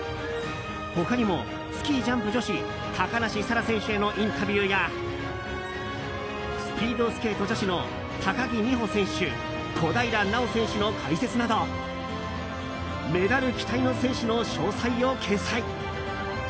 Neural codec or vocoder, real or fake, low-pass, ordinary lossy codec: none; real; none; none